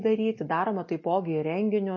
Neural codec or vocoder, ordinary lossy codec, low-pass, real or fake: none; MP3, 32 kbps; 7.2 kHz; real